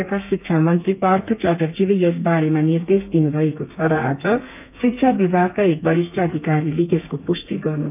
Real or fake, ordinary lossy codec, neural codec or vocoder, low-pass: fake; none; codec, 32 kHz, 1.9 kbps, SNAC; 3.6 kHz